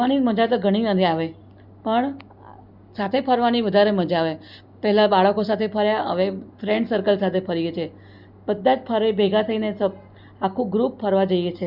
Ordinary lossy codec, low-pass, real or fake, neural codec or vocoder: Opus, 64 kbps; 5.4 kHz; real; none